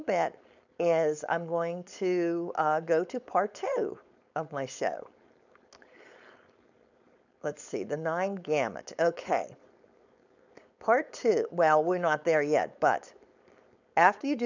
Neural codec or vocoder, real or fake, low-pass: codec, 16 kHz, 4.8 kbps, FACodec; fake; 7.2 kHz